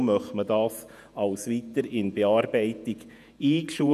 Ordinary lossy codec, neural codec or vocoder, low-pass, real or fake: none; vocoder, 48 kHz, 128 mel bands, Vocos; 14.4 kHz; fake